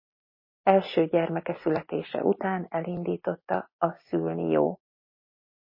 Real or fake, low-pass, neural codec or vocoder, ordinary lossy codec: real; 5.4 kHz; none; MP3, 24 kbps